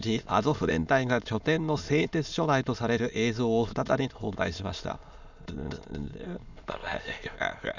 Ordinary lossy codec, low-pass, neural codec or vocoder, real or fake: none; 7.2 kHz; autoencoder, 22.05 kHz, a latent of 192 numbers a frame, VITS, trained on many speakers; fake